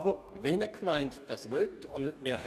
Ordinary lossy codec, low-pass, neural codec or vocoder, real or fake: none; 14.4 kHz; codec, 44.1 kHz, 2.6 kbps, DAC; fake